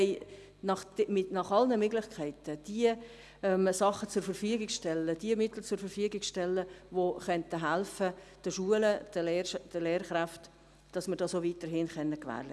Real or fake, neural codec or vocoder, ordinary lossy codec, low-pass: real; none; none; none